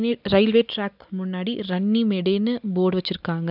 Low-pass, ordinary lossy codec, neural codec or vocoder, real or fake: 5.4 kHz; none; none; real